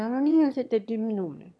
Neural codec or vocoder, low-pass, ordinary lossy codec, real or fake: autoencoder, 22.05 kHz, a latent of 192 numbers a frame, VITS, trained on one speaker; none; none; fake